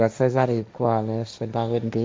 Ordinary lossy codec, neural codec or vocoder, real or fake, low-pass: none; codec, 16 kHz, 1.1 kbps, Voila-Tokenizer; fake; none